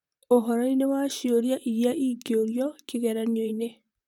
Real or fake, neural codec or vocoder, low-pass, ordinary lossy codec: fake; vocoder, 44.1 kHz, 128 mel bands, Pupu-Vocoder; 19.8 kHz; none